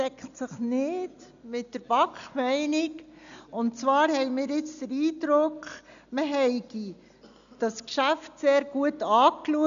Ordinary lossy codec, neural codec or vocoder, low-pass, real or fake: none; none; 7.2 kHz; real